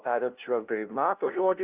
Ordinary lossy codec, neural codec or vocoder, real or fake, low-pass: Opus, 24 kbps; codec, 16 kHz, 0.5 kbps, FunCodec, trained on LibriTTS, 25 frames a second; fake; 3.6 kHz